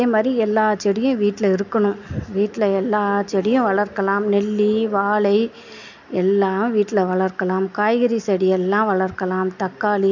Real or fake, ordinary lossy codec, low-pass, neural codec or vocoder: real; none; 7.2 kHz; none